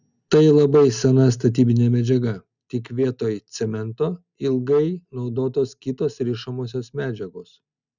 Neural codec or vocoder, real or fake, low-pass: none; real; 7.2 kHz